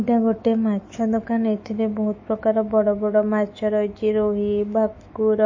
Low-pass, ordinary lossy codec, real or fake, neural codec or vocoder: 7.2 kHz; MP3, 32 kbps; fake; autoencoder, 48 kHz, 128 numbers a frame, DAC-VAE, trained on Japanese speech